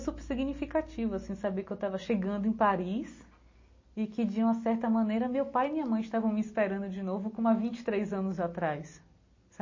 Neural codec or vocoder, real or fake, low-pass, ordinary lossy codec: none; real; 7.2 kHz; MP3, 32 kbps